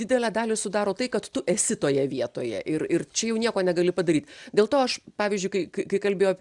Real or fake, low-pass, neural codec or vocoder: real; 10.8 kHz; none